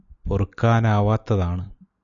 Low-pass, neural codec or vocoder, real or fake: 7.2 kHz; none; real